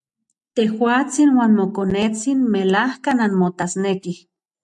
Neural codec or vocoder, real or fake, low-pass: none; real; 10.8 kHz